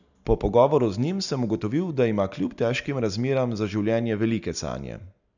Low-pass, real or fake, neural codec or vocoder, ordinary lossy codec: 7.2 kHz; real; none; none